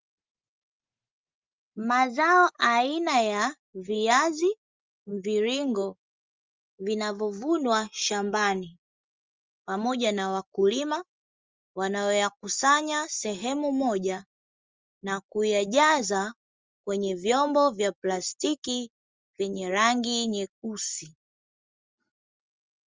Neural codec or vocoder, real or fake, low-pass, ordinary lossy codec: none; real; 7.2 kHz; Opus, 32 kbps